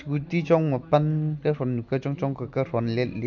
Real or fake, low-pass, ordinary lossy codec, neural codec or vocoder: real; 7.2 kHz; none; none